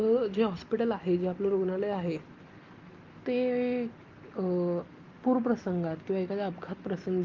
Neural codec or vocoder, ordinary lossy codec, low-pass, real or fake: none; Opus, 32 kbps; 7.2 kHz; real